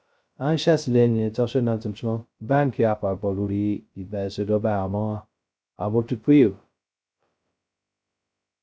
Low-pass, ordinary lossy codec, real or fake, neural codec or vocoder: none; none; fake; codec, 16 kHz, 0.2 kbps, FocalCodec